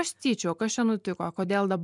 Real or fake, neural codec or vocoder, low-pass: fake; vocoder, 44.1 kHz, 128 mel bands every 256 samples, BigVGAN v2; 10.8 kHz